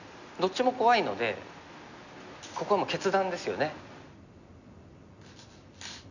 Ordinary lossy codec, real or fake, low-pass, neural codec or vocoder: none; real; 7.2 kHz; none